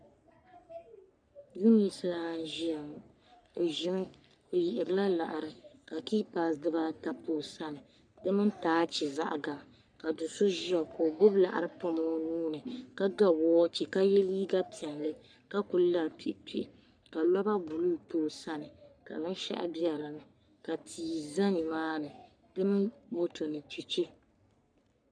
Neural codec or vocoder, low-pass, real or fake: codec, 44.1 kHz, 3.4 kbps, Pupu-Codec; 9.9 kHz; fake